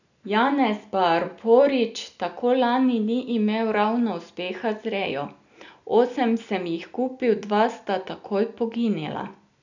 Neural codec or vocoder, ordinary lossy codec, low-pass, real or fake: none; none; 7.2 kHz; real